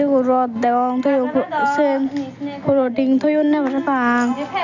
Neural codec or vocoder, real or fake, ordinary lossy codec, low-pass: none; real; none; 7.2 kHz